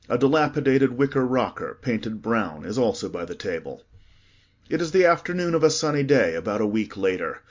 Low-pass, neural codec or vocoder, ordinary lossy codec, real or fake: 7.2 kHz; none; MP3, 64 kbps; real